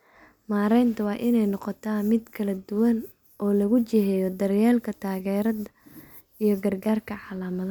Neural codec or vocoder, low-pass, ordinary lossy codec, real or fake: none; none; none; real